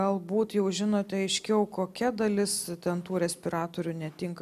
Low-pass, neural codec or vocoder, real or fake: 14.4 kHz; none; real